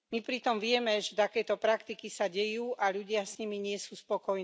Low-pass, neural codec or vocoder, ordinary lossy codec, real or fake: none; none; none; real